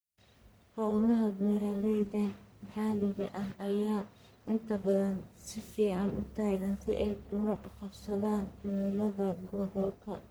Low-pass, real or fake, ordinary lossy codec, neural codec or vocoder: none; fake; none; codec, 44.1 kHz, 1.7 kbps, Pupu-Codec